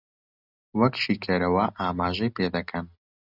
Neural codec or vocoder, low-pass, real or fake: none; 5.4 kHz; real